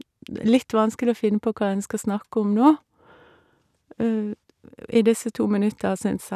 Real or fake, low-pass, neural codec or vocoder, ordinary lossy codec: real; 14.4 kHz; none; none